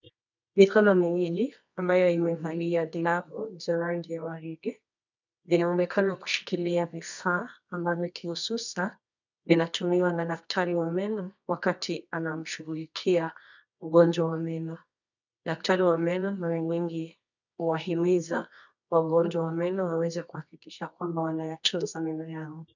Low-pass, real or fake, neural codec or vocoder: 7.2 kHz; fake; codec, 24 kHz, 0.9 kbps, WavTokenizer, medium music audio release